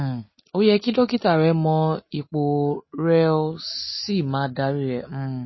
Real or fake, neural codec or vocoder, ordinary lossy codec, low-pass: real; none; MP3, 24 kbps; 7.2 kHz